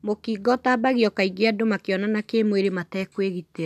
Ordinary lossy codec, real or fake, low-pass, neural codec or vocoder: none; real; 14.4 kHz; none